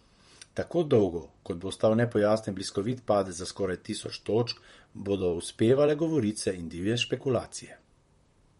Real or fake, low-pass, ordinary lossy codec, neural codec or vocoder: fake; 19.8 kHz; MP3, 48 kbps; vocoder, 44.1 kHz, 128 mel bands, Pupu-Vocoder